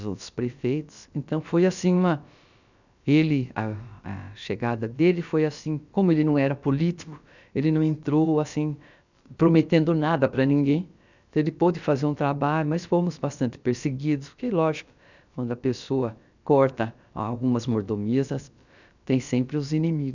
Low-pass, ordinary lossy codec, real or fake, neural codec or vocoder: 7.2 kHz; none; fake; codec, 16 kHz, about 1 kbps, DyCAST, with the encoder's durations